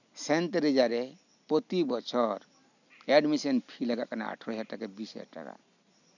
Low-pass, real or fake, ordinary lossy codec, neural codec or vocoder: 7.2 kHz; real; none; none